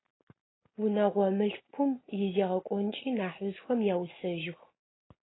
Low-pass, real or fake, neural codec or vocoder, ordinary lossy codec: 7.2 kHz; real; none; AAC, 16 kbps